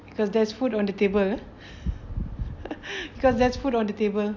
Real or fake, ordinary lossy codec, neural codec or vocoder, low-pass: real; AAC, 48 kbps; none; 7.2 kHz